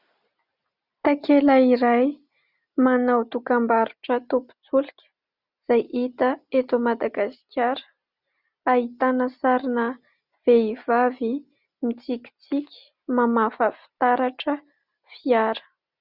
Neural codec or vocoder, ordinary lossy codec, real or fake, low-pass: none; Opus, 64 kbps; real; 5.4 kHz